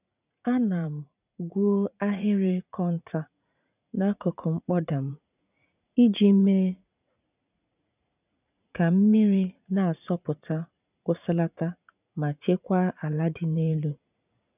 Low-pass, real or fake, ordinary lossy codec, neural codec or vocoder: 3.6 kHz; fake; none; vocoder, 44.1 kHz, 80 mel bands, Vocos